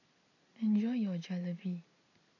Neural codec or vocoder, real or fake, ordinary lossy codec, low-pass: none; real; none; 7.2 kHz